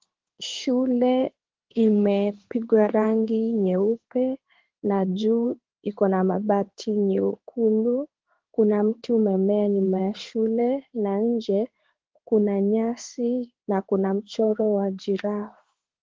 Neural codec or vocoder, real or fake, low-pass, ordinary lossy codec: codec, 16 kHz in and 24 kHz out, 1 kbps, XY-Tokenizer; fake; 7.2 kHz; Opus, 16 kbps